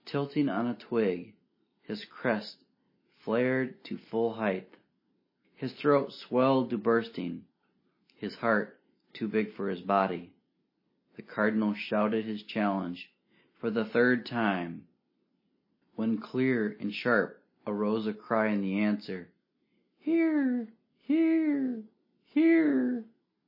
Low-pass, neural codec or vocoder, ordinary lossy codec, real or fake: 5.4 kHz; none; MP3, 24 kbps; real